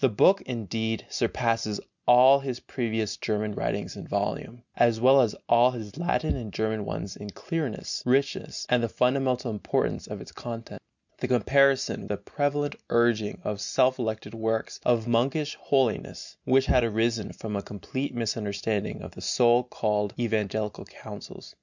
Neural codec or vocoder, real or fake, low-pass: none; real; 7.2 kHz